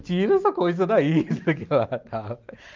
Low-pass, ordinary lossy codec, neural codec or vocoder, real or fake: 7.2 kHz; Opus, 24 kbps; none; real